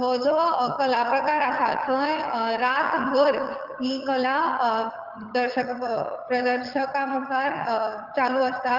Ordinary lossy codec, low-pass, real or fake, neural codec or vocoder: Opus, 24 kbps; 5.4 kHz; fake; vocoder, 22.05 kHz, 80 mel bands, HiFi-GAN